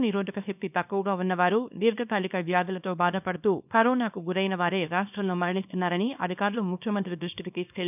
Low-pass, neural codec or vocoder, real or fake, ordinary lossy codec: 3.6 kHz; codec, 24 kHz, 0.9 kbps, WavTokenizer, small release; fake; none